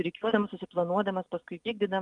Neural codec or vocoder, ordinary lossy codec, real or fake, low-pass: none; Opus, 32 kbps; real; 10.8 kHz